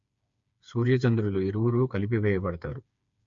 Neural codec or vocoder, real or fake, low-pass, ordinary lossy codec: codec, 16 kHz, 4 kbps, FreqCodec, smaller model; fake; 7.2 kHz; MP3, 48 kbps